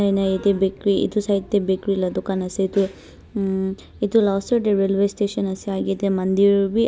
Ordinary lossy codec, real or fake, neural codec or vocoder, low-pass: none; real; none; none